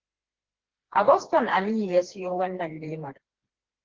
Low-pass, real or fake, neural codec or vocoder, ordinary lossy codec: 7.2 kHz; fake; codec, 16 kHz, 2 kbps, FreqCodec, smaller model; Opus, 16 kbps